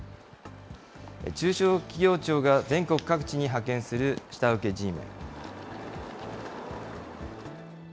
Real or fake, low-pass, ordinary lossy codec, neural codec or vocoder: real; none; none; none